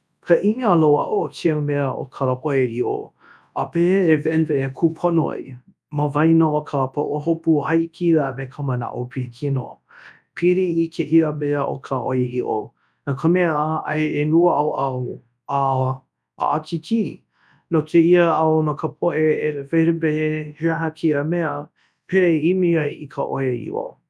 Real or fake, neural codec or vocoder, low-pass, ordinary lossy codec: fake; codec, 24 kHz, 0.9 kbps, WavTokenizer, large speech release; none; none